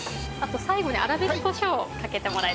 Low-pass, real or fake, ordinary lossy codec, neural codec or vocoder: none; real; none; none